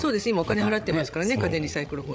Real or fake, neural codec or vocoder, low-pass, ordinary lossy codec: fake; codec, 16 kHz, 16 kbps, FreqCodec, larger model; none; none